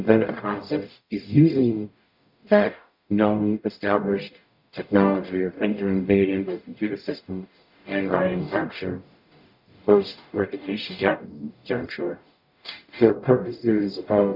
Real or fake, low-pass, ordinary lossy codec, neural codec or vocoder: fake; 5.4 kHz; MP3, 48 kbps; codec, 44.1 kHz, 0.9 kbps, DAC